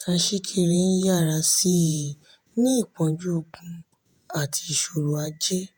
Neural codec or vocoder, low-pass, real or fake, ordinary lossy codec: vocoder, 48 kHz, 128 mel bands, Vocos; none; fake; none